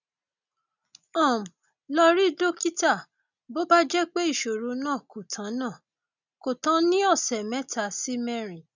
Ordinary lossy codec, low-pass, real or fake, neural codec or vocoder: none; 7.2 kHz; real; none